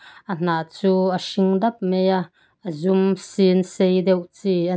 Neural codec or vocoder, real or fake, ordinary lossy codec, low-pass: none; real; none; none